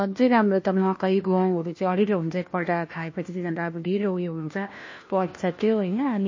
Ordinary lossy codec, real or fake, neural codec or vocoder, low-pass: MP3, 32 kbps; fake; codec, 16 kHz, 1 kbps, FunCodec, trained on LibriTTS, 50 frames a second; 7.2 kHz